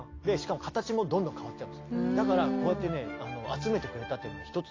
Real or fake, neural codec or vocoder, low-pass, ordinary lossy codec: real; none; 7.2 kHz; AAC, 32 kbps